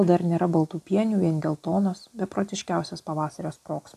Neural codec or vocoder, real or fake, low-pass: none; real; 14.4 kHz